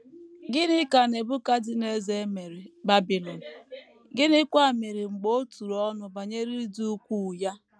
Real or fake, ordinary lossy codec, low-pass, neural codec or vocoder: real; none; none; none